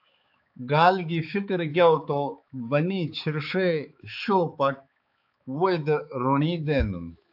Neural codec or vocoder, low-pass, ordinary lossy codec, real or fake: codec, 16 kHz, 4 kbps, X-Codec, HuBERT features, trained on balanced general audio; 5.4 kHz; AAC, 48 kbps; fake